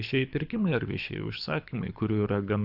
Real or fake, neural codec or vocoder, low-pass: fake; codec, 24 kHz, 6 kbps, HILCodec; 5.4 kHz